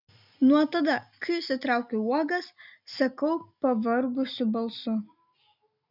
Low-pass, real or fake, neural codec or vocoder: 5.4 kHz; real; none